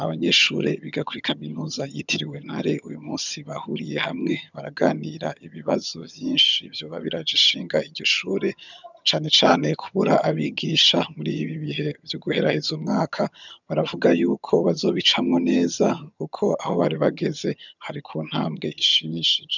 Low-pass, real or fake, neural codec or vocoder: 7.2 kHz; fake; vocoder, 22.05 kHz, 80 mel bands, HiFi-GAN